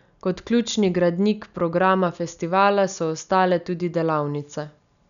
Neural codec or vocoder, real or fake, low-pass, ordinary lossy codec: none; real; 7.2 kHz; none